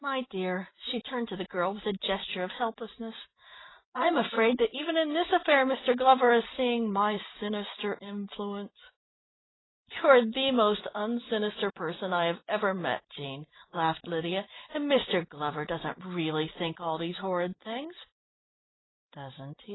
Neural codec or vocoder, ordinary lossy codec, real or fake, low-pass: none; AAC, 16 kbps; real; 7.2 kHz